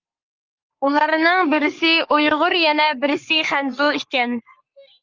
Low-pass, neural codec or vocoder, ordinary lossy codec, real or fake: 7.2 kHz; codec, 44.1 kHz, 3.4 kbps, Pupu-Codec; Opus, 24 kbps; fake